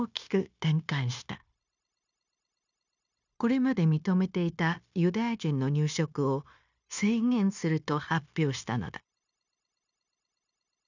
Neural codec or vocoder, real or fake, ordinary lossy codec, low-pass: codec, 16 kHz, 0.9 kbps, LongCat-Audio-Codec; fake; none; 7.2 kHz